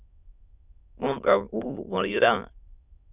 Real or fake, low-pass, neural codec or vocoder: fake; 3.6 kHz; autoencoder, 22.05 kHz, a latent of 192 numbers a frame, VITS, trained on many speakers